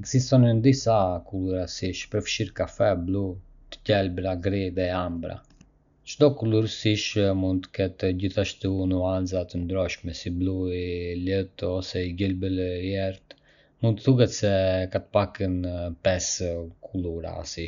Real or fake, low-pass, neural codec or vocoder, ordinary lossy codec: real; 7.2 kHz; none; none